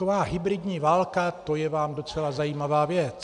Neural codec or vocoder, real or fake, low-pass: none; real; 10.8 kHz